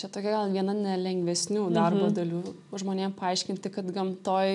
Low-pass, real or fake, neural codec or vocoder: 10.8 kHz; real; none